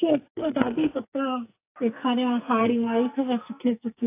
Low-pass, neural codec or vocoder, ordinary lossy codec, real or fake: 3.6 kHz; codec, 44.1 kHz, 2.6 kbps, SNAC; AAC, 24 kbps; fake